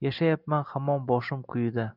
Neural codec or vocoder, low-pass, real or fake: none; 5.4 kHz; real